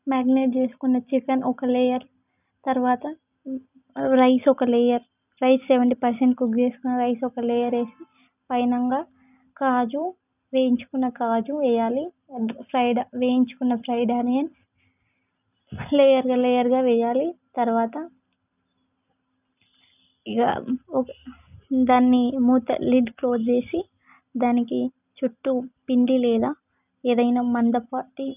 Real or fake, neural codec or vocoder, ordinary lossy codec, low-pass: real; none; none; 3.6 kHz